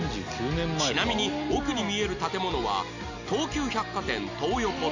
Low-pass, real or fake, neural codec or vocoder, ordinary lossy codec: 7.2 kHz; real; none; none